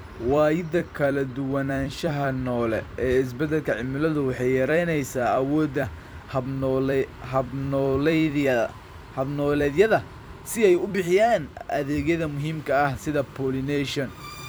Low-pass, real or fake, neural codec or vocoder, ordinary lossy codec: none; fake; vocoder, 44.1 kHz, 128 mel bands every 256 samples, BigVGAN v2; none